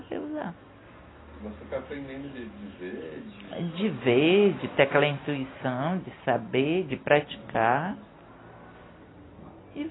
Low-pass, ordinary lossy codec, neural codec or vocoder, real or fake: 7.2 kHz; AAC, 16 kbps; none; real